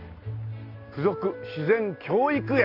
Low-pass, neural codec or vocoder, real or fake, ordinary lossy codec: 5.4 kHz; none; real; AAC, 32 kbps